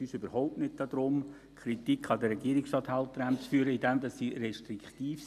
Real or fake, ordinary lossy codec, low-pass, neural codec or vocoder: real; none; 14.4 kHz; none